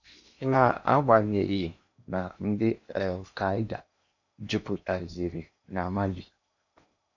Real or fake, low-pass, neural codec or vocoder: fake; 7.2 kHz; codec, 16 kHz in and 24 kHz out, 0.8 kbps, FocalCodec, streaming, 65536 codes